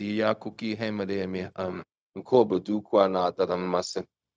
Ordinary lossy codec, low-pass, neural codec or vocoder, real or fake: none; none; codec, 16 kHz, 0.4 kbps, LongCat-Audio-Codec; fake